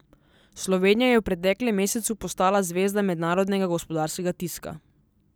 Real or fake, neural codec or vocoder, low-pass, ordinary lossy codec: real; none; none; none